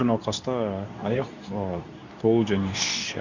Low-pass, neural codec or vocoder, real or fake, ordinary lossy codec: 7.2 kHz; codec, 24 kHz, 0.9 kbps, WavTokenizer, medium speech release version 2; fake; none